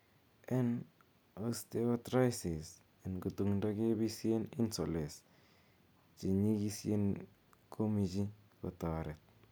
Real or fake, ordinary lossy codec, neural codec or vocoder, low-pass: real; none; none; none